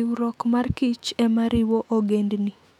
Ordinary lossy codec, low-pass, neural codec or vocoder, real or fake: none; 19.8 kHz; autoencoder, 48 kHz, 128 numbers a frame, DAC-VAE, trained on Japanese speech; fake